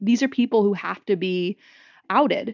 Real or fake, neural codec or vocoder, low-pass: real; none; 7.2 kHz